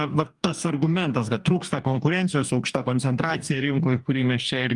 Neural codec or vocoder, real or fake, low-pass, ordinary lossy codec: codec, 44.1 kHz, 2.6 kbps, DAC; fake; 10.8 kHz; Opus, 32 kbps